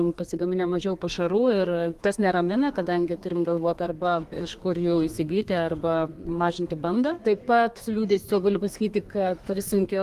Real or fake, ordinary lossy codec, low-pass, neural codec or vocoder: fake; Opus, 32 kbps; 14.4 kHz; codec, 32 kHz, 1.9 kbps, SNAC